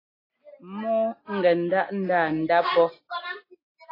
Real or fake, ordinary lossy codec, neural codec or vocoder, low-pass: real; AAC, 24 kbps; none; 5.4 kHz